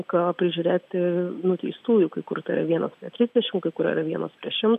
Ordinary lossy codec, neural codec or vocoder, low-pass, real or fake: AAC, 96 kbps; none; 14.4 kHz; real